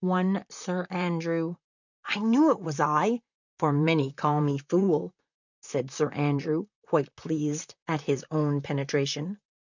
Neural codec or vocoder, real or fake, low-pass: vocoder, 44.1 kHz, 128 mel bands, Pupu-Vocoder; fake; 7.2 kHz